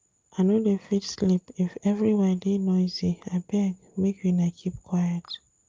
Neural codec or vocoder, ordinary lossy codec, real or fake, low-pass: none; Opus, 24 kbps; real; 7.2 kHz